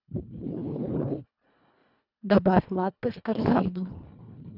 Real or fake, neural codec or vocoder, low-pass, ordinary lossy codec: fake; codec, 24 kHz, 1.5 kbps, HILCodec; 5.4 kHz; none